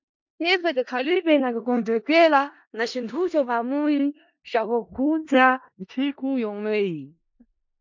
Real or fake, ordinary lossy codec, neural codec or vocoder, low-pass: fake; MP3, 48 kbps; codec, 16 kHz in and 24 kHz out, 0.4 kbps, LongCat-Audio-Codec, four codebook decoder; 7.2 kHz